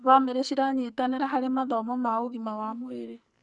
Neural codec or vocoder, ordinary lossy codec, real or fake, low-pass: codec, 44.1 kHz, 2.6 kbps, SNAC; none; fake; 10.8 kHz